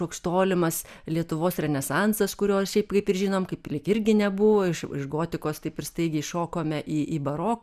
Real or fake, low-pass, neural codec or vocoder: real; 14.4 kHz; none